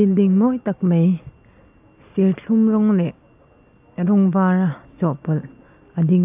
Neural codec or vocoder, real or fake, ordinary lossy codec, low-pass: none; real; AAC, 32 kbps; 3.6 kHz